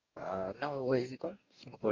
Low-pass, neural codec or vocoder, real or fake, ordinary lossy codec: 7.2 kHz; codec, 44.1 kHz, 2.6 kbps, DAC; fake; Opus, 64 kbps